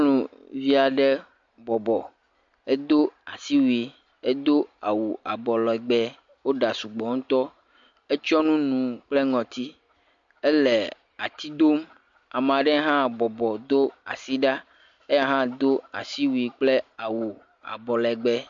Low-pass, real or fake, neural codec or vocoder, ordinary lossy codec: 7.2 kHz; real; none; MP3, 48 kbps